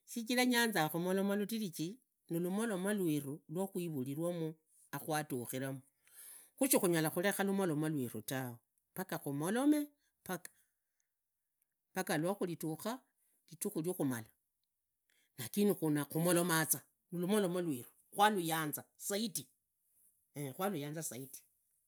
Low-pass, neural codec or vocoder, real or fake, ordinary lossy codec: none; none; real; none